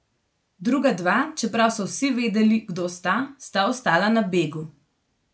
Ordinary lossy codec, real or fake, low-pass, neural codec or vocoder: none; real; none; none